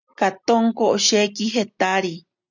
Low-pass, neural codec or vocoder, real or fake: 7.2 kHz; none; real